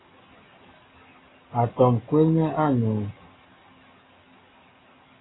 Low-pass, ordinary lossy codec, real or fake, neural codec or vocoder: 7.2 kHz; AAC, 16 kbps; real; none